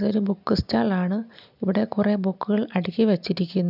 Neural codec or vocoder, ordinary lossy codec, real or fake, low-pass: none; none; real; 5.4 kHz